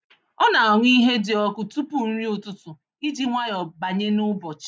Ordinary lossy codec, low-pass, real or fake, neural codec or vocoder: none; none; real; none